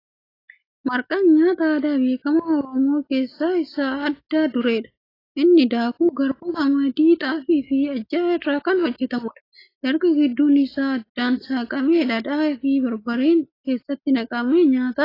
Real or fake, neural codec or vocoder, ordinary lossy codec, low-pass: real; none; AAC, 24 kbps; 5.4 kHz